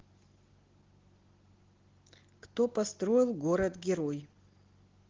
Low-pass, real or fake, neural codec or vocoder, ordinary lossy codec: 7.2 kHz; real; none; Opus, 16 kbps